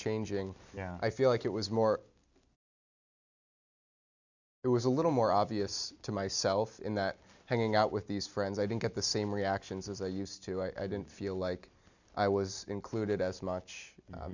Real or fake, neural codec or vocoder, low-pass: real; none; 7.2 kHz